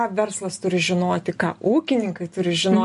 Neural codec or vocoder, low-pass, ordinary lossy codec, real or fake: none; 14.4 kHz; MP3, 48 kbps; real